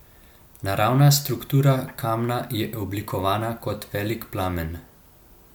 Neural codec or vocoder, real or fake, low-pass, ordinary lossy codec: none; real; 19.8 kHz; MP3, 96 kbps